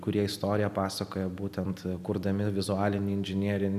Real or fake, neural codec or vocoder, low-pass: real; none; 14.4 kHz